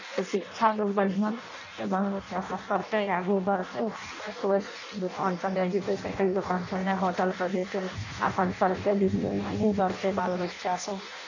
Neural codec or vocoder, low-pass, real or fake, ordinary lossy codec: codec, 16 kHz in and 24 kHz out, 0.6 kbps, FireRedTTS-2 codec; 7.2 kHz; fake; none